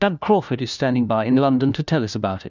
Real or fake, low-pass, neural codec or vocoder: fake; 7.2 kHz; codec, 16 kHz, 1 kbps, FunCodec, trained on LibriTTS, 50 frames a second